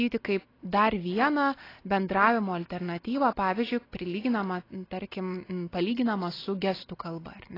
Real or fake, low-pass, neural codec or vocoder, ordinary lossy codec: real; 5.4 kHz; none; AAC, 24 kbps